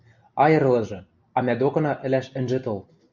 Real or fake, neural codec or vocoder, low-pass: real; none; 7.2 kHz